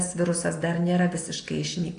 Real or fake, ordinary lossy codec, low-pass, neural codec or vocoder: real; AAC, 48 kbps; 9.9 kHz; none